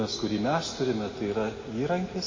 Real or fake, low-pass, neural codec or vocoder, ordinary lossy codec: real; 7.2 kHz; none; MP3, 32 kbps